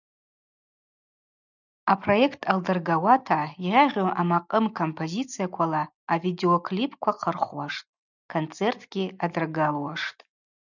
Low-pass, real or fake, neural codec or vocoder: 7.2 kHz; real; none